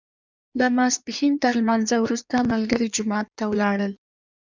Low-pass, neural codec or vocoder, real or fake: 7.2 kHz; codec, 16 kHz in and 24 kHz out, 1.1 kbps, FireRedTTS-2 codec; fake